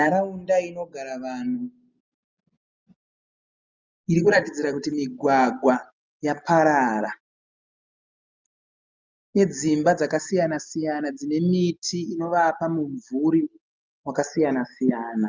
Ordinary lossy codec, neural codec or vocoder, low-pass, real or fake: Opus, 32 kbps; none; 7.2 kHz; real